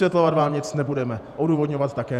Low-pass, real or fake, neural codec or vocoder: 14.4 kHz; fake; vocoder, 48 kHz, 128 mel bands, Vocos